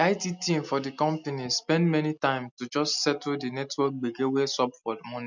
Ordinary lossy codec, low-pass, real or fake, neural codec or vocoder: none; 7.2 kHz; real; none